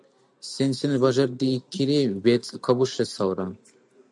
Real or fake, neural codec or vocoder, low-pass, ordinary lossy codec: real; none; 10.8 kHz; MP3, 96 kbps